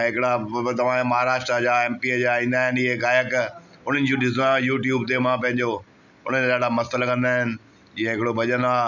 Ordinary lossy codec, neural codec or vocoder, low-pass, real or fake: none; none; 7.2 kHz; real